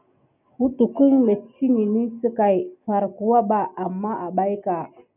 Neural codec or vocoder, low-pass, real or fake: none; 3.6 kHz; real